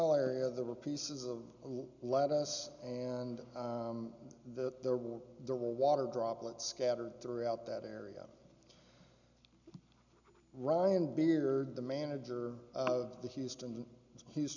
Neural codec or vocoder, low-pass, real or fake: none; 7.2 kHz; real